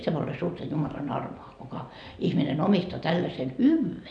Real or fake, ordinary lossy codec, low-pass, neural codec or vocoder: real; none; 9.9 kHz; none